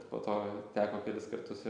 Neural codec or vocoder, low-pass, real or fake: none; 9.9 kHz; real